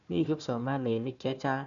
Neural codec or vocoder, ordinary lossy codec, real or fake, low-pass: codec, 16 kHz, 1 kbps, FunCodec, trained on Chinese and English, 50 frames a second; none; fake; 7.2 kHz